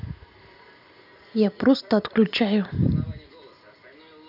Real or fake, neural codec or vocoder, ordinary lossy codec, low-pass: real; none; none; 5.4 kHz